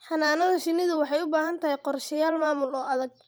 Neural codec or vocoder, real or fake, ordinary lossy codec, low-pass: vocoder, 44.1 kHz, 128 mel bands every 512 samples, BigVGAN v2; fake; none; none